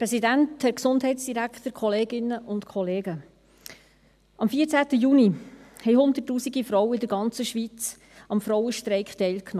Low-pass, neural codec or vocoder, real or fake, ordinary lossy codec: 14.4 kHz; none; real; none